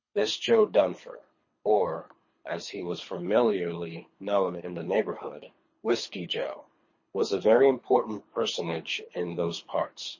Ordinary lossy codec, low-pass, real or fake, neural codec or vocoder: MP3, 32 kbps; 7.2 kHz; fake; codec, 24 kHz, 3 kbps, HILCodec